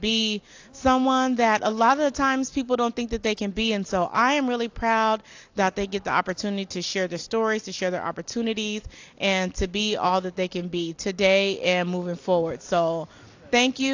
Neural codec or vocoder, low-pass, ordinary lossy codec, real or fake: none; 7.2 kHz; AAC, 48 kbps; real